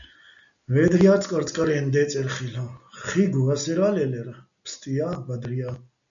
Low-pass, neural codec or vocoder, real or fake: 7.2 kHz; none; real